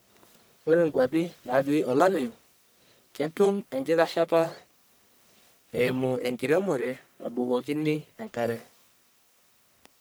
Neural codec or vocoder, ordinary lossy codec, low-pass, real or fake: codec, 44.1 kHz, 1.7 kbps, Pupu-Codec; none; none; fake